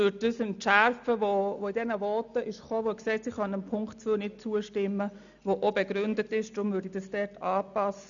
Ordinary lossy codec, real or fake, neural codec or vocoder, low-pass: none; real; none; 7.2 kHz